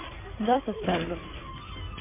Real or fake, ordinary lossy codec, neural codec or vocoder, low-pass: fake; MP3, 24 kbps; codec, 16 kHz in and 24 kHz out, 2.2 kbps, FireRedTTS-2 codec; 3.6 kHz